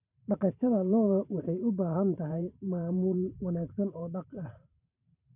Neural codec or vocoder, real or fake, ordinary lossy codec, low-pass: vocoder, 44.1 kHz, 128 mel bands every 512 samples, BigVGAN v2; fake; none; 3.6 kHz